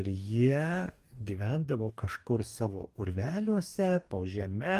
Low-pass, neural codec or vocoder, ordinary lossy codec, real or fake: 14.4 kHz; codec, 44.1 kHz, 2.6 kbps, DAC; Opus, 32 kbps; fake